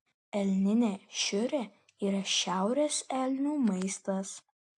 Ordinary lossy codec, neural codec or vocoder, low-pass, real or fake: AAC, 64 kbps; none; 10.8 kHz; real